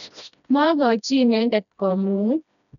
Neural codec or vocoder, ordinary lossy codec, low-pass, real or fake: codec, 16 kHz, 1 kbps, FreqCodec, smaller model; MP3, 96 kbps; 7.2 kHz; fake